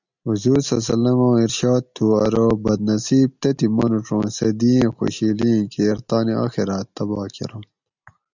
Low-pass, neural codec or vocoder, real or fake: 7.2 kHz; none; real